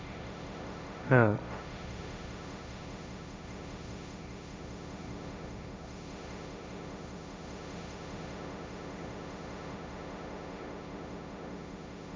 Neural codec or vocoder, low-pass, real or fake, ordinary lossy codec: codec, 16 kHz, 1.1 kbps, Voila-Tokenizer; none; fake; none